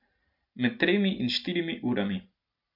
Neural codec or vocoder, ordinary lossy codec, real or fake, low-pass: none; none; real; 5.4 kHz